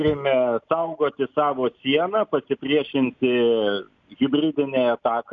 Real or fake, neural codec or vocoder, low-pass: real; none; 7.2 kHz